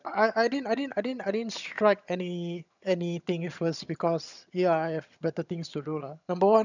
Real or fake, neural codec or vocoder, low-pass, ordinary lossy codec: fake; vocoder, 22.05 kHz, 80 mel bands, HiFi-GAN; 7.2 kHz; none